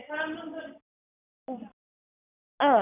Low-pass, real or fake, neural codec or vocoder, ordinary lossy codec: 3.6 kHz; real; none; none